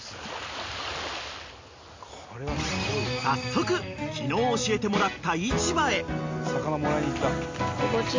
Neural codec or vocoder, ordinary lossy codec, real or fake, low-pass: none; MP3, 48 kbps; real; 7.2 kHz